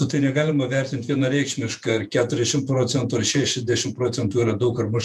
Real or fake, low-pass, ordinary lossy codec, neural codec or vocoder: fake; 14.4 kHz; MP3, 96 kbps; vocoder, 48 kHz, 128 mel bands, Vocos